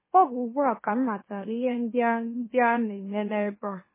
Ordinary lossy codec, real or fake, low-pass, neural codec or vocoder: MP3, 16 kbps; fake; 3.6 kHz; autoencoder, 44.1 kHz, a latent of 192 numbers a frame, MeloTTS